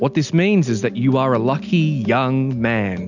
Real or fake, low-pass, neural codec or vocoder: real; 7.2 kHz; none